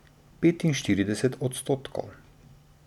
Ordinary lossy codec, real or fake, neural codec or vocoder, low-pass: none; real; none; 19.8 kHz